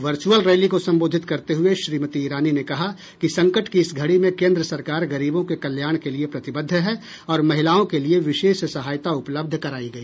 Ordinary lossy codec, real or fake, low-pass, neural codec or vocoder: none; real; none; none